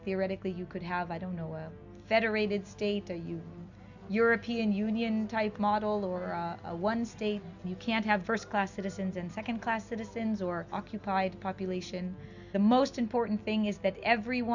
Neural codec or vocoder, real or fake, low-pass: none; real; 7.2 kHz